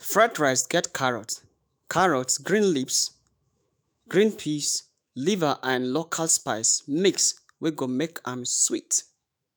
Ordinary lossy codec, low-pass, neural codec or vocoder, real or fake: none; none; autoencoder, 48 kHz, 128 numbers a frame, DAC-VAE, trained on Japanese speech; fake